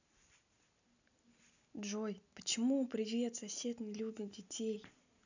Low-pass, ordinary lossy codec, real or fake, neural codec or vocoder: 7.2 kHz; none; real; none